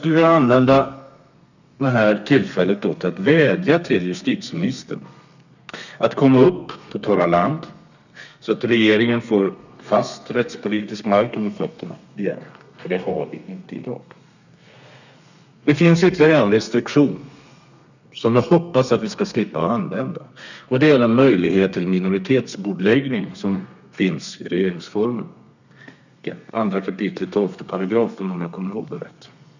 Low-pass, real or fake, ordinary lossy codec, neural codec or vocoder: 7.2 kHz; fake; none; codec, 32 kHz, 1.9 kbps, SNAC